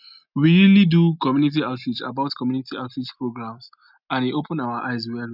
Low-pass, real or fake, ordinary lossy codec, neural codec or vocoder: 5.4 kHz; real; none; none